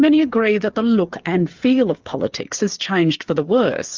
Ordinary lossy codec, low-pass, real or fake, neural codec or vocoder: Opus, 24 kbps; 7.2 kHz; fake; codec, 16 kHz, 8 kbps, FreqCodec, smaller model